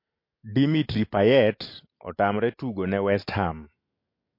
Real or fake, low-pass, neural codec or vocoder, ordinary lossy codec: real; 5.4 kHz; none; MP3, 32 kbps